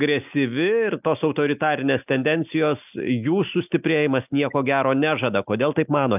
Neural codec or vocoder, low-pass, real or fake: none; 3.6 kHz; real